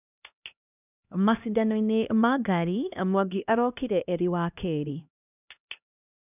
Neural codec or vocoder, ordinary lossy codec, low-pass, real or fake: codec, 16 kHz, 1 kbps, X-Codec, HuBERT features, trained on LibriSpeech; none; 3.6 kHz; fake